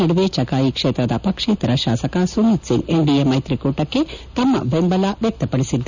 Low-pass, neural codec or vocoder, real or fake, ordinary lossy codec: 7.2 kHz; none; real; none